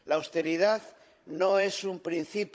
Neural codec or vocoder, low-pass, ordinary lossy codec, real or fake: codec, 16 kHz, 16 kbps, FunCodec, trained on Chinese and English, 50 frames a second; none; none; fake